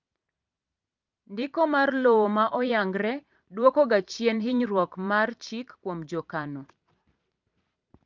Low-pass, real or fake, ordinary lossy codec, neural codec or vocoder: 7.2 kHz; fake; Opus, 24 kbps; vocoder, 44.1 kHz, 128 mel bands every 512 samples, BigVGAN v2